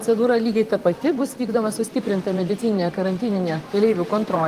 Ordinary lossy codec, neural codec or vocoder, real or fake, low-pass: Opus, 24 kbps; vocoder, 44.1 kHz, 128 mel bands, Pupu-Vocoder; fake; 14.4 kHz